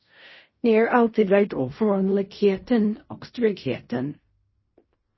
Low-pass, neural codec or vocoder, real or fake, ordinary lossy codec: 7.2 kHz; codec, 16 kHz in and 24 kHz out, 0.4 kbps, LongCat-Audio-Codec, fine tuned four codebook decoder; fake; MP3, 24 kbps